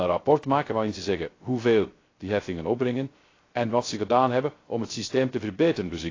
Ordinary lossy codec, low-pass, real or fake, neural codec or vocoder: AAC, 32 kbps; 7.2 kHz; fake; codec, 16 kHz, 0.3 kbps, FocalCodec